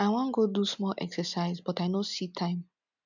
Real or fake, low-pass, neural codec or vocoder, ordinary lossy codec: real; 7.2 kHz; none; none